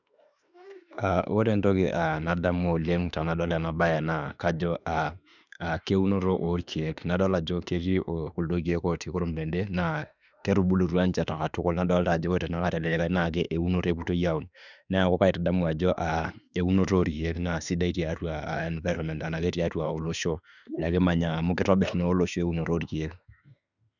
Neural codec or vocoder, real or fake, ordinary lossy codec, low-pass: autoencoder, 48 kHz, 32 numbers a frame, DAC-VAE, trained on Japanese speech; fake; none; 7.2 kHz